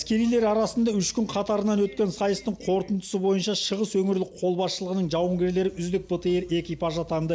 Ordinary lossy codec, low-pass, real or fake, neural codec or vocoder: none; none; real; none